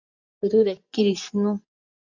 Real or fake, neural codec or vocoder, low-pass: fake; vocoder, 24 kHz, 100 mel bands, Vocos; 7.2 kHz